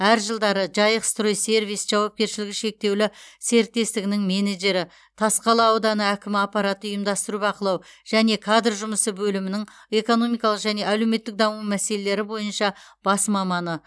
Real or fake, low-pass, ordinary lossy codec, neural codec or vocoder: real; none; none; none